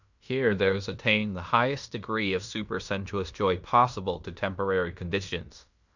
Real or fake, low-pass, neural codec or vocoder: fake; 7.2 kHz; codec, 16 kHz in and 24 kHz out, 0.9 kbps, LongCat-Audio-Codec, fine tuned four codebook decoder